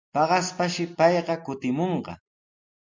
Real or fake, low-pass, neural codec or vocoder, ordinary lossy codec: real; 7.2 kHz; none; MP3, 48 kbps